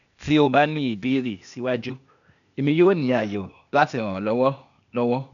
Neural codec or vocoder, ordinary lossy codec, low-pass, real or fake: codec, 16 kHz, 0.8 kbps, ZipCodec; none; 7.2 kHz; fake